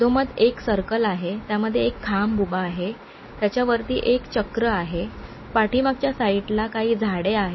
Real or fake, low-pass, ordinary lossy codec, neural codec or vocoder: real; 7.2 kHz; MP3, 24 kbps; none